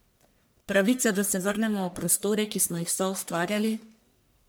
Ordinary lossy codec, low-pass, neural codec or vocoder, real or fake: none; none; codec, 44.1 kHz, 1.7 kbps, Pupu-Codec; fake